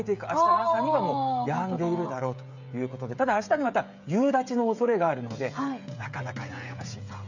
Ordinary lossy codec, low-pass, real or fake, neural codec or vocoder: none; 7.2 kHz; fake; codec, 16 kHz, 16 kbps, FreqCodec, smaller model